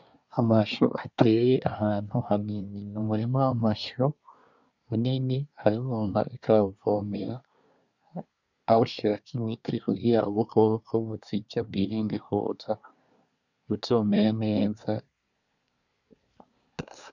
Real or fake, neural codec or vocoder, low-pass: fake; codec, 24 kHz, 1 kbps, SNAC; 7.2 kHz